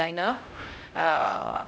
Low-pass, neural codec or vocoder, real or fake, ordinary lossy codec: none; codec, 16 kHz, 0.5 kbps, X-Codec, HuBERT features, trained on LibriSpeech; fake; none